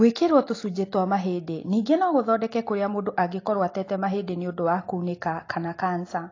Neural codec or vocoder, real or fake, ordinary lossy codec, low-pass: none; real; AAC, 32 kbps; 7.2 kHz